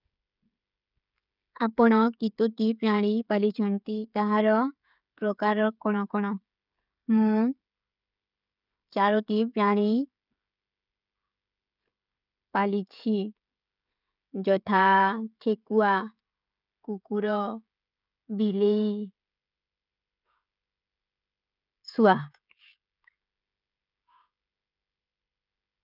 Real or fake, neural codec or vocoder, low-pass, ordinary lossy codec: fake; codec, 16 kHz, 16 kbps, FreqCodec, smaller model; 5.4 kHz; none